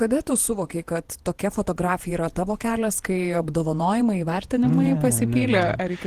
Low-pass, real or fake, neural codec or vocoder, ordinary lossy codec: 14.4 kHz; fake; vocoder, 48 kHz, 128 mel bands, Vocos; Opus, 16 kbps